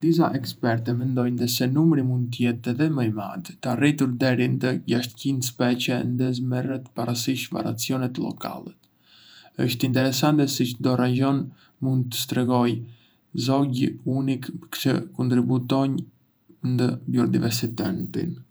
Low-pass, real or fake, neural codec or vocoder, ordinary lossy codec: none; fake; vocoder, 48 kHz, 128 mel bands, Vocos; none